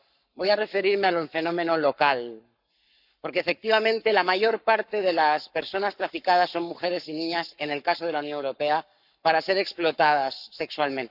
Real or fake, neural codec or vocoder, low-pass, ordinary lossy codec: fake; codec, 44.1 kHz, 7.8 kbps, Pupu-Codec; 5.4 kHz; none